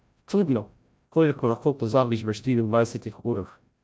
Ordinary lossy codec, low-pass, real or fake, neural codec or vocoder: none; none; fake; codec, 16 kHz, 0.5 kbps, FreqCodec, larger model